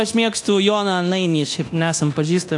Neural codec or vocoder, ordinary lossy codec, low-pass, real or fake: codec, 24 kHz, 0.9 kbps, DualCodec; AAC, 64 kbps; 10.8 kHz; fake